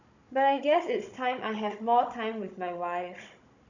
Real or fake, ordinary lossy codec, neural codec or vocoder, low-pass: fake; none; codec, 16 kHz, 16 kbps, FunCodec, trained on Chinese and English, 50 frames a second; 7.2 kHz